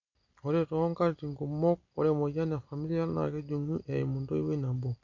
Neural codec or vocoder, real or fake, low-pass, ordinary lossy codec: none; real; 7.2 kHz; none